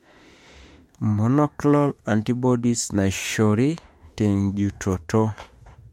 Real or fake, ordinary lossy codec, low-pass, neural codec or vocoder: fake; MP3, 64 kbps; 19.8 kHz; autoencoder, 48 kHz, 32 numbers a frame, DAC-VAE, trained on Japanese speech